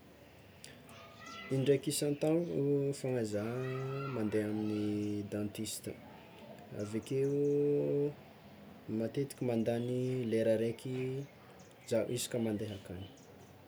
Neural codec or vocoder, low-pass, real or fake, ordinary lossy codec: none; none; real; none